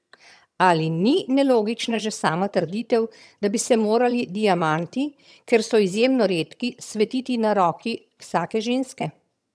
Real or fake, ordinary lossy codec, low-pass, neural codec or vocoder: fake; none; none; vocoder, 22.05 kHz, 80 mel bands, HiFi-GAN